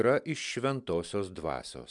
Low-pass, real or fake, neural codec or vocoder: 10.8 kHz; real; none